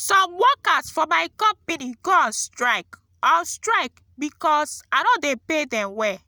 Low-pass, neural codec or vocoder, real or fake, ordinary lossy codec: none; none; real; none